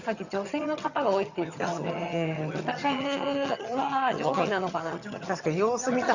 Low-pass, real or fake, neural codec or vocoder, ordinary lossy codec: 7.2 kHz; fake; vocoder, 22.05 kHz, 80 mel bands, HiFi-GAN; Opus, 64 kbps